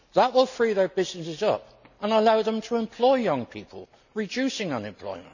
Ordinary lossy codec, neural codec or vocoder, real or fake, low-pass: none; none; real; 7.2 kHz